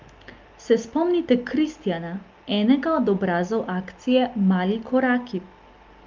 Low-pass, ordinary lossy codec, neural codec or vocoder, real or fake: 7.2 kHz; Opus, 24 kbps; none; real